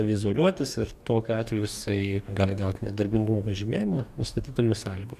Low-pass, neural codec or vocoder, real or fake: 14.4 kHz; codec, 44.1 kHz, 2.6 kbps, DAC; fake